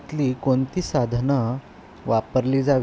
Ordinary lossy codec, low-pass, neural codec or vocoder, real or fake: none; none; none; real